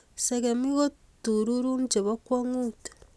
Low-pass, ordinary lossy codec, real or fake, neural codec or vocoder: none; none; real; none